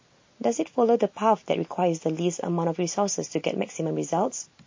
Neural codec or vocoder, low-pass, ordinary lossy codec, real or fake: none; 7.2 kHz; MP3, 32 kbps; real